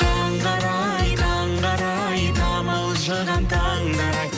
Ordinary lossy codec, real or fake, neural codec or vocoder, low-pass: none; real; none; none